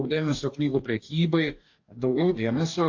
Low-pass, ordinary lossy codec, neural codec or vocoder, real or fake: 7.2 kHz; AAC, 48 kbps; codec, 44.1 kHz, 2.6 kbps, DAC; fake